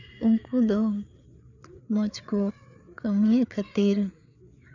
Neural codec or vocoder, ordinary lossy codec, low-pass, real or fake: codec, 16 kHz, 4 kbps, FreqCodec, larger model; none; 7.2 kHz; fake